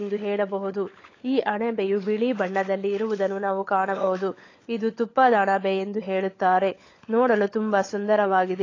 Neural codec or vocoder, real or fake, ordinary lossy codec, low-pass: codec, 16 kHz, 16 kbps, FunCodec, trained on LibriTTS, 50 frames a second; fake; AAC, 32 kbps; 7.2 kHz